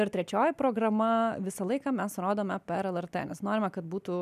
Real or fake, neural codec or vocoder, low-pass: real; none; 14.4 kHz